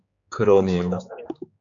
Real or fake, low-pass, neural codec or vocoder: fake; 7.2 kHz; codec, 16 kHz, 4 kbps, X-Codec, HuBERT features, trained on general audio